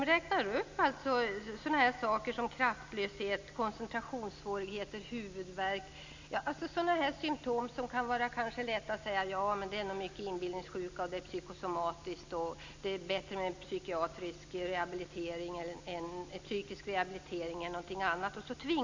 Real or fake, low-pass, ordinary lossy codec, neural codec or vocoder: real; 7.2 kHz; MP3, 64 kbps; none